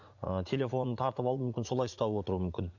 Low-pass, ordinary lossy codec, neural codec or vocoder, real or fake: 7.2 kHz; none; vocoder, 44.1 kHz, 80 mel bands, Vocos; fake